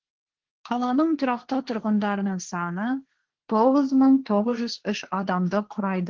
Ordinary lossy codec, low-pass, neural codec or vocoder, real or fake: Opus, 16 kbps; 7.2 kHz; codec, 16 kHz, 1.1 kbps, Voila-Tokenizer; fake